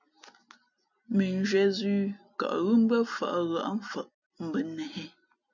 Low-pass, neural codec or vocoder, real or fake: 7.2 kHz; none; real